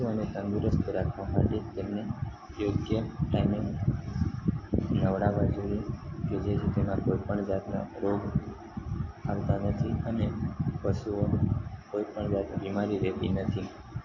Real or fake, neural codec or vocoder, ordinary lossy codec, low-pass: real; none; AAC, 32 kbps; 7.2 kHz